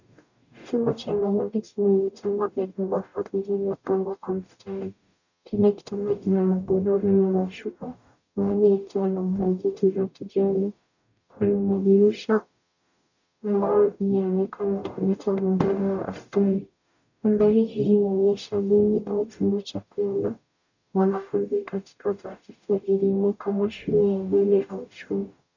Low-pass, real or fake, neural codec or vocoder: 7.2 kHz; fake; codec, 44.1 kHz, 0.9 kbps, DAC